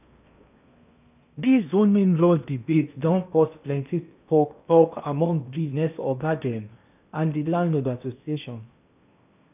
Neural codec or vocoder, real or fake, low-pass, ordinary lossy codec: codec, 16 kHz in and 24 kHz out, 0.8 kbps, FocalCodec, streaming, 65536 codes; fake; 3.6 kHz; none